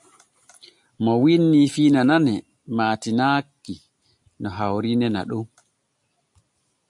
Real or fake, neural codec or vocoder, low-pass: real; none; 10.8 kHz